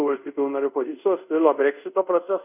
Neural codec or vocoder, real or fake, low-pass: codec, 24 kHz, 0.5 kbps, DualCodec; fake; 3.6 kHz